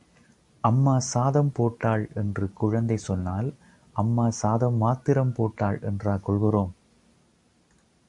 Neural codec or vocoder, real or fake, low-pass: none; real; 10.8 kHz